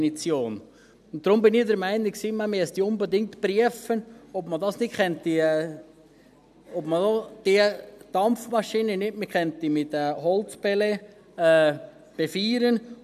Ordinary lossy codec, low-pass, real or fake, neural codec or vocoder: none; 14.4 kHz; real; none